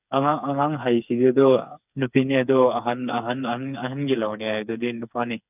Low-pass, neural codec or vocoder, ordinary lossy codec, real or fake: 3.6 kHz; codec, 16 kHz, 4 kbps, FreqCodec, smaller model; none; fake